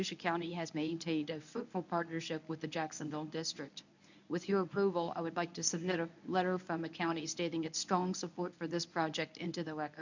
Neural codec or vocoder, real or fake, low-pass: codec, 24 kHz, 0.9 kbps, WavTokenizer, medium speech release version 1; fake; 7.2 kHz